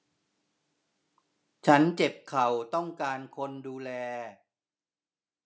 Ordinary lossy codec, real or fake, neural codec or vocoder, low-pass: none; real; none; none